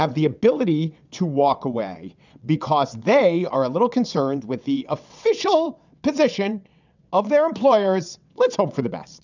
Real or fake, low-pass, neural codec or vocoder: fake; 7.2 kHz; vocoder, 22.05 kHz, 80 mel bands, WaveNeXt